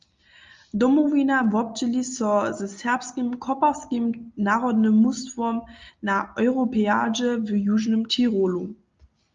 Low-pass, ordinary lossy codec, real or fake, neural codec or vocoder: 7.2 kHz; Opus, 32 kbps; real; none